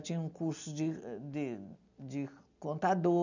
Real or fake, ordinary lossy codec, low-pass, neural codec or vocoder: real; none; 7.2 kHz; none